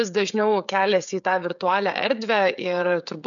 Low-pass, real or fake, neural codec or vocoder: 7.2 kHz; fake; codec, 16 kHz, 16 kbps, FreqCodec, smaller model